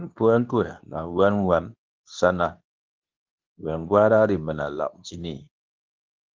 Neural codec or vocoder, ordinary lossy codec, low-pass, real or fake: codec, 24 kHz, 0.9 kbps, WavTokenizer, medium speech release version 2; Opus, 24 kbps; 7.2 kHz; fake